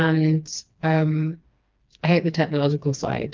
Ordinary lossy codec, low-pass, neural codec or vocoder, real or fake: Opus, 24 kbps; 7.2 kHz; codec, 16 kHz, 2 kbps, FreqCodec, smaller model; fake